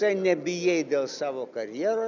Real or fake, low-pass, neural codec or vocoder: real; 7.2 kHz; none